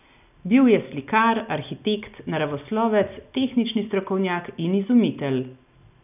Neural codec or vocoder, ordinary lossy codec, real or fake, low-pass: none; none; real; 3.6 kHz